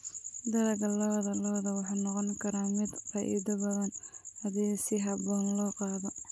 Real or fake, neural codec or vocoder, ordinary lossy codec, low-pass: real; none; none; none